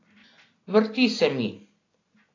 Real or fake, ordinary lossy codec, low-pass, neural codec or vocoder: real; AAC, 32 kbps; 7.2 kHz; none